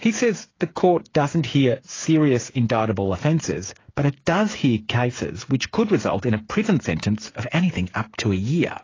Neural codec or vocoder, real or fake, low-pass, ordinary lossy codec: codec, 16 kHz, 8 kbps, FreqCodec, smaller model; fake; 7.2 kHz; AAC, 32 kbps